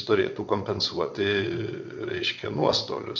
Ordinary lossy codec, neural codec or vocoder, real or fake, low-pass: MP3, 48 kbps; vocoder, 22.05 kHz, 80 mel bands, WaveNeXt; fake; 7.2 kHz